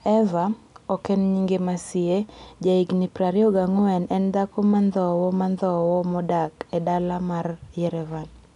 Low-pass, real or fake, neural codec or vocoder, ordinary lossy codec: 10.8 kHz; real; none; none